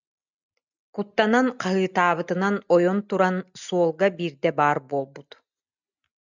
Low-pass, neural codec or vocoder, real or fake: 7.2 kHz; none; real